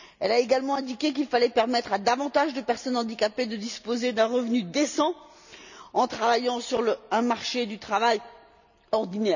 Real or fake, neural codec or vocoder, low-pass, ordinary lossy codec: real; none; 7.2 kHz; none